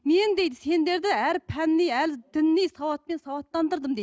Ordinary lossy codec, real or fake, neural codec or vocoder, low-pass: none; real; none; none